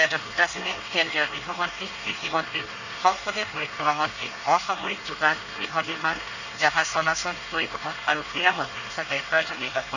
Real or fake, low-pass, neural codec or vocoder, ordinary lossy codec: fake; 7.2 kHz; codec, 24 kHz, 1 kbps, SNAC; none